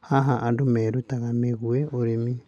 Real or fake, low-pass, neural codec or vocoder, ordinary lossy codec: real; none; none; none